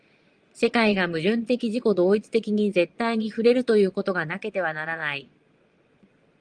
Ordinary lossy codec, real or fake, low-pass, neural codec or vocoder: Opus, 24 kbps; fake; 9.9 kHz; vocoder, 22.05 kHz, 80 mel bands, Vocos